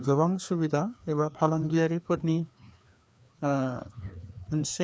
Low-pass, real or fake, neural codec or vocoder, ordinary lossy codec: none; fake; codec, 16 kHz, 2 kbps, FreqCodec, larger model; none